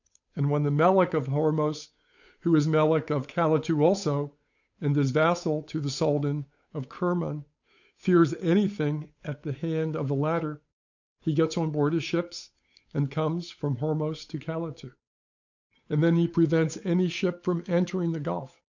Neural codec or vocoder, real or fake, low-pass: codec, 16 kHz, 8 kbps, FunCodec, trained on Chinese and English, 25 frames a second; fake; 7.2 kHz